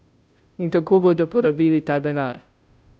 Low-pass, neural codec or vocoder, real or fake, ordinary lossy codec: none; codec, 16 kHz, 0.5 kbps, FunCodec, trained on Chinese and English, 25 frames a second; fake; none